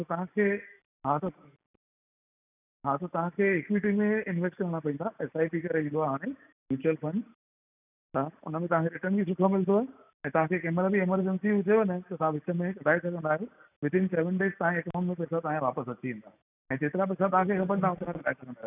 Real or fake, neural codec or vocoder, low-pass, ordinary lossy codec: real; none; 3.6 kHz; none